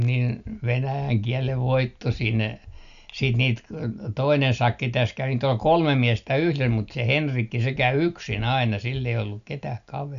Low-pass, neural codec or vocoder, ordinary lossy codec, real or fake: 7.2 kHz; none; none; real